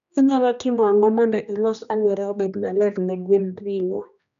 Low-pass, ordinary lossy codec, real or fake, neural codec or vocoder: 7.2 kHz; none; fake; codec, 16 kHz, 1 kbps, X-Codec, HuBERT features, trained on general audio